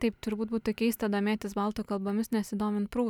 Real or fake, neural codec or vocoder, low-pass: real; none; 19.8 kHz